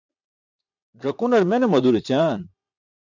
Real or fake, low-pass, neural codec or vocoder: fake; 7.2 kHz; vocoder, 22.05 kHz, 80 mel bands, Vocos